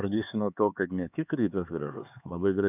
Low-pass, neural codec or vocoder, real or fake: 3.6 kHz; codec, 16 kHz, 4 kbps, X-Codec, HuBERT features, trained on LibriSpeech; fake